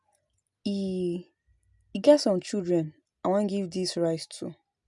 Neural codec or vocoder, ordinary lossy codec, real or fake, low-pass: none; none; real; 10.8 kHz